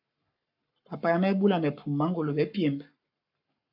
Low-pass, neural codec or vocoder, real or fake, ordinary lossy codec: 5.4 kHz; codec, 44.1 kHz, 7.8 kbps, Pupu-Codec; fake; AAC, 48 kbps